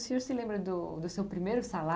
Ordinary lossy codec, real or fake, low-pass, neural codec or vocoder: none; real; none; none